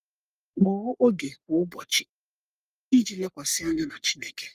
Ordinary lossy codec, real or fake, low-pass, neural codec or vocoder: Opus, 32 kbps; fake; 14.4 kHz; codec, 44.1 kHz, 3.4 kbps, Pupu-Codec